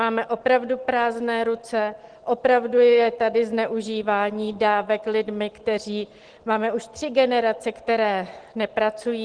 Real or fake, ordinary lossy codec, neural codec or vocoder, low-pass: real; Opus, 16 kbps; none; 9.9 kHz